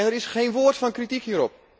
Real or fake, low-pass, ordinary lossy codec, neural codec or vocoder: real; none; none; none